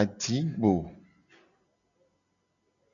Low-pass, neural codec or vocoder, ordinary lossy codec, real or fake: 7.2 kHz; none; MP3, 96 kbps; real